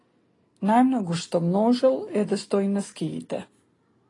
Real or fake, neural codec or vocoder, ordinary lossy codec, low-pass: real; none; AAC, 32 kbps; 10.8 kHz